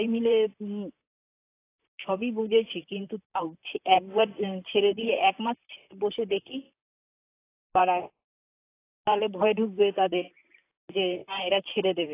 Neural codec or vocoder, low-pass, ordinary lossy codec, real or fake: vocoder, 44.1 kHz, 128 mel bands, Pupu-Vocoder; 3.6 kHz; AAC, 24 kbps; fake